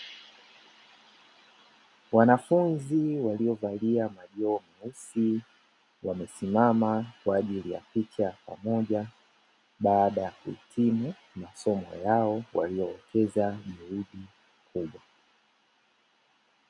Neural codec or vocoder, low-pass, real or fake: none; 10.8 kHz; real